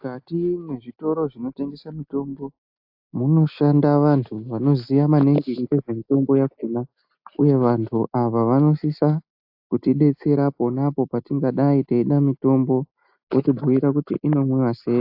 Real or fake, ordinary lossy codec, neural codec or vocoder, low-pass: real; AAC, 48 kbps; none; 5.4 kHz